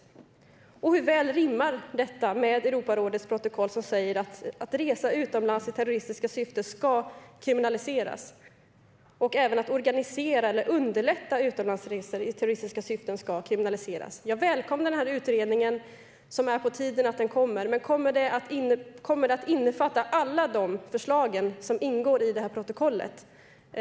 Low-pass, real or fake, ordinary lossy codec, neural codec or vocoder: none; real; none; none